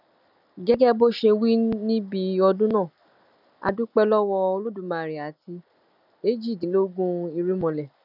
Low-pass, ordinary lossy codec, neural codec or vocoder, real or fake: 5.4 kHz; none; none; real